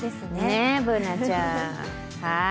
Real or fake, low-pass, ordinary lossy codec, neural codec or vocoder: real; none; none; none